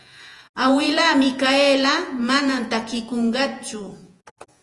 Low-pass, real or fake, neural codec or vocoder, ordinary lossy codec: 10.8 kHz; fake; vocoder, 48 kHz, 128 mel bands, Vocos; Opus, 32 kbps